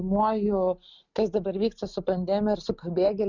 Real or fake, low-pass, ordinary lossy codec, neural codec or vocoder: real; 7.2 kHz; Opus, 64 kbps; none